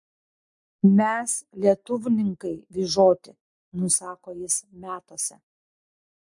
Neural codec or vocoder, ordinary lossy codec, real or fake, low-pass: none; MP3, 64 kbps; real; 10.8 kHz